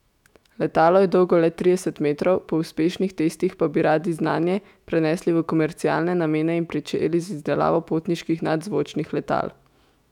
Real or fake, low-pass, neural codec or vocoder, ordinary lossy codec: fake; 19.8 kHz; autoencoder, 48 kHz, 128 numbers a frame, DAC-VAE, trained on Japanese speech; none